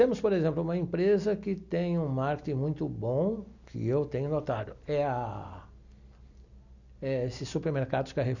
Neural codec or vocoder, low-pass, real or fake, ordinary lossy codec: none; 7.2 kHz; real; none